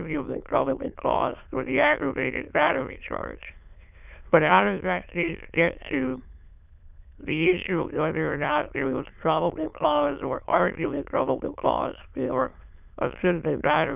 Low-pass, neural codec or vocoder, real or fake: 3.6 kHz; autoencoder, 22.05 kHz, a latent of 192 numbers a frame, VITS, trained on many speakers; fake